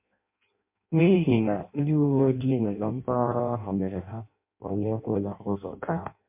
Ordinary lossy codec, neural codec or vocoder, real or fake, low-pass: MP3, 32 kbps; codec, 16 kHz in and 24 kHz out, 0.6 kbps, FireRedTTS-2 codec; fake; 3.6 kHz